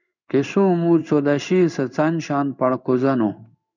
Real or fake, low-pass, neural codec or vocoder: fake; 7.2 kHz; codec, 16 kHz in and 24 kHz out, 1 kbps, XY-Tokenizer